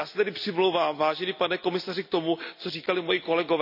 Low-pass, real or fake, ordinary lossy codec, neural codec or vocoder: 5.4 kHz; real; none; none